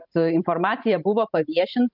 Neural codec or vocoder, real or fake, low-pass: vocoder, 44.1 kHz, 128 mel bands every 512 samples, BigVGAN v2; fake; 5.4 kHz